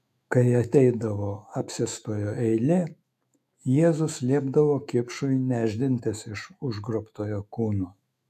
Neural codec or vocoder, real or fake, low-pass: autoencoder, 48 kHz, 128 numbers a frame, DAC-VAE, trained on Japanese speech; fake; 14.4 kHz